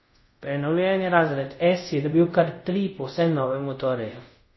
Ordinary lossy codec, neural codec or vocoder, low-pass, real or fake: MP3, 24 kbps; codec, 24 kHz, 0.5 kbps, DualCodec; 7.2 kHz; fake